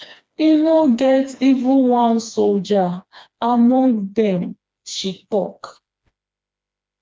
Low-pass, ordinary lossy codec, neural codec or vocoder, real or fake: none; none; codec, 16 kHz, 2 kbps, FreqCodec, smaller model; fake